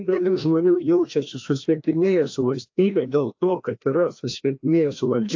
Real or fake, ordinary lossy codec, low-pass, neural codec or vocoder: fake; AAC, 48 kbps; 7.2 kHz; codec, 16 kHz, 1 kbps, FreqCodec, larger model